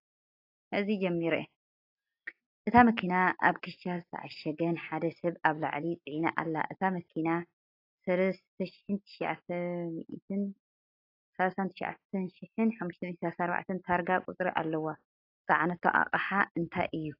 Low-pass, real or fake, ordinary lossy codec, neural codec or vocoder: 5.4 kHz; real; AAC, 32 kbps; none